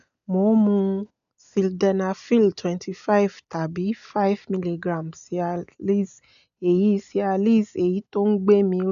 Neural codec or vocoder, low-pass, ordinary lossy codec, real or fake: none; 7.2 kHz; none; real